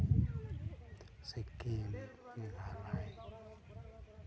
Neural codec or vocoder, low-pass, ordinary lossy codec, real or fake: none; none; none; real